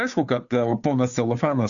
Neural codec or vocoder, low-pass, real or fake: codec, 16 kHz, 2 kbps, FunCodec, trained on Chinese and English, 25 frames a second; 7.2 kHz; fake